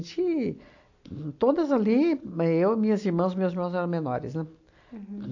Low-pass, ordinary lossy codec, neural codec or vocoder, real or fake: 7.2 kHz; AAC, 48 kbps; none; real